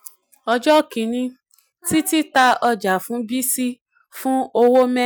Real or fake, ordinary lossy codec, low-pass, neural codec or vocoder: real; none; none; none